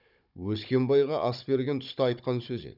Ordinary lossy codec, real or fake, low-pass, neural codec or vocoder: none; fake; 5.4 kHz; codec, 16 kHz, 16 kbps, FunCodec, trained on Chinese and English, 50 frames a second